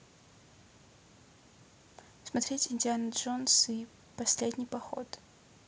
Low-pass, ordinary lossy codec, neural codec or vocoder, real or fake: none; none; none; real